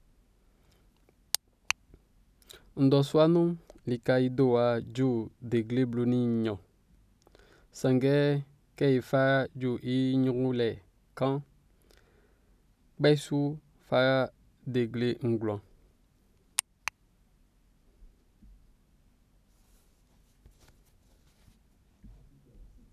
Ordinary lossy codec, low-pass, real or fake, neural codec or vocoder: none; 14.4 kHz; real; none